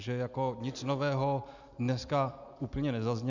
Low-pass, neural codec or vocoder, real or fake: 7.2 kHz; none; real